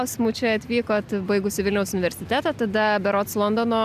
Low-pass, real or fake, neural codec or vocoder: 14.4 kHz; real; none